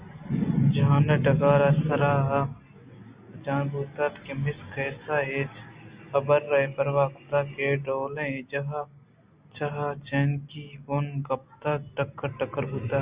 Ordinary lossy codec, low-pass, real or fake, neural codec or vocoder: Opus, 64 kbps; 3.6 kHz; real; none